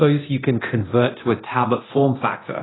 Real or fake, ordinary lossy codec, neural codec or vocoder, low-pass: fake; AAC, 16 kbps; codec, 24 kHz, 0.9 kbps, DualCodec; 7.2 kHz